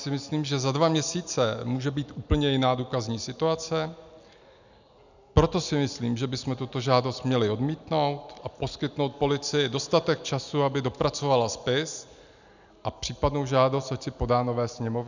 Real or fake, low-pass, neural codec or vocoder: real; 7.2 kHz; none